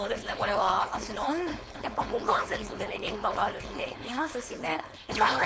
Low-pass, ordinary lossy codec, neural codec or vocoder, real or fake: none; none; codec, 16 kHz, 4.8 kbps, FACodec; fake